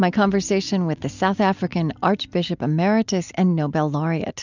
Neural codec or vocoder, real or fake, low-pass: none; real; 7.2 kHz